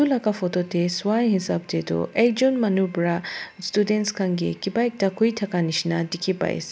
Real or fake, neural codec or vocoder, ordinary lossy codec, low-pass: real; none; none; none